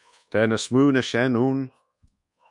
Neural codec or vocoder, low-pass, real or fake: codec, 24 kHz, 1.2 kbps, DualCodec; 10.8 kHz; fake